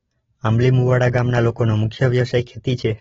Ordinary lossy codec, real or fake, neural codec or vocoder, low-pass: AAC, 24 kbps; real; none; 7.2 kHz